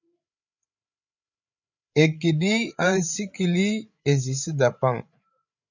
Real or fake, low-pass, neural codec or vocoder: fake; 7.2 kHz; codec, 16 kHz, 8 kbps, FreqCodec, larger model